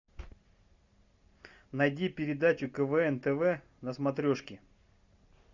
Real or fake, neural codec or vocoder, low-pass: real; none; 7.2 kHz